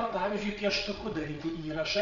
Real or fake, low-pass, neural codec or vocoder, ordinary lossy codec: fake; 7.2 kHz; codec, 16 kHz, 8 kbps, FreqCodec, larger model; MP3, 96 kbps